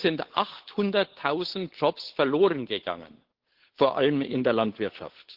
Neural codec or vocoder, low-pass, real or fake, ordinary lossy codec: codec, 16 kHz, 8 kbps, FunCodec, trained on Chinese and English, 25 frames a second; 5.4 kHz; fake; Opus, 16 kbps